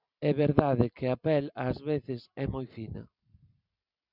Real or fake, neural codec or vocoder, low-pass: real; none; 5.4 kHz